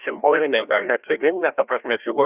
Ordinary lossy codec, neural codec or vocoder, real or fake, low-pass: Opus, 64 kbps; codec, 16 kHz, 1 kbps, FreqCodec, larger model; fake; 3.6 kHz